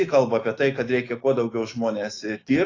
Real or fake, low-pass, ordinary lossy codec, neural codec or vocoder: fake; 7.2 kHz; AAC, 32 kbps; autoencoder, 48 kHz, 128 numbers a frame, DAC-VAE, trained on Japanese speech